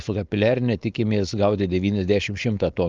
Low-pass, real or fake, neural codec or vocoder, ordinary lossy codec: 7.2 kHz; fake; codec, 16 kHz, 4.8 kbps, FACodec; Opus, 32 kbps